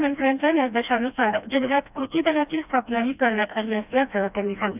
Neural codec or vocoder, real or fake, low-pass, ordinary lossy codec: codec, 16 kHz, 1 kbps, FreqCodec, smaller model; fake; 3.6 kHz; none